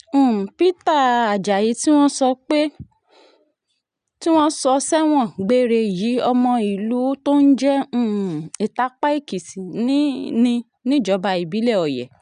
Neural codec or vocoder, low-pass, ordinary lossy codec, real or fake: none; 9.9 kHz; none; real